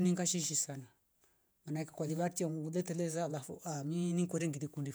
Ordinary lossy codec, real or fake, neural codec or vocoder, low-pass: none; fake; vocoder, 48 kHz, 128 mel bands, Vocos; none